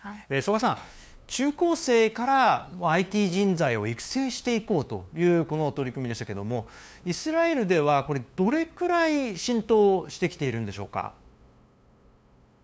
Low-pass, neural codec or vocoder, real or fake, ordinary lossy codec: none; codec, 16 kHz, 2 kbps, FunCodec, trained on LibriTTS, 25 frames a second; fake; none